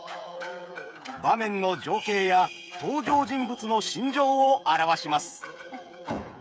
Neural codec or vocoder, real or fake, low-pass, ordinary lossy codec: codec, 16 kHz, 8 kbps, FreqCodec, smaller model; fake; none; none